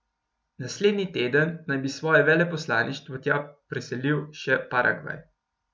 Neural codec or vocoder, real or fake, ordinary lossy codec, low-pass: none; real; none; none